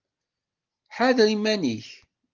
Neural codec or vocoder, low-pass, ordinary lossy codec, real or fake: none; 7.2 kHz; Opus, 32 kbps; real